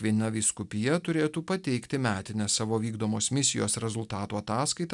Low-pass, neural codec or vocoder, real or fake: 10.8 kHz; none; real